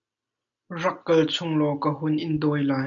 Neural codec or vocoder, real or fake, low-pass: none; real; 7.2 kHz